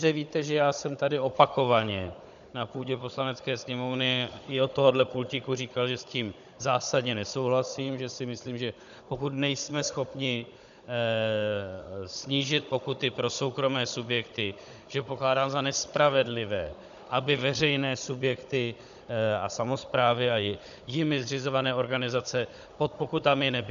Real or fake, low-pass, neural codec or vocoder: fake; 7.2 kHz; codec, 16 kHz, 16 kbps, FunCodec, trained on Chinese and English, 50 frames a second